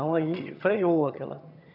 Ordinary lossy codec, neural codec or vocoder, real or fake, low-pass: none; vocoder, 22.05 kHz, 80 mel bands, HiFi-GAN; fake; 5.4 kHz